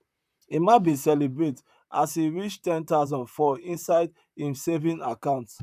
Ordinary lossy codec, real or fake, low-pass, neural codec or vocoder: none; fake; 14.4 kHz; vocoder, 44.1 kHz, 128 mel bands, Pupu-Vocoder